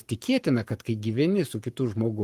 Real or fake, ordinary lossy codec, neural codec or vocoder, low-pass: fake; Opus, 24 kbps; codec, 44.1 kHz, 7.8 kbps, Pupu-Codec; 14.4 kHz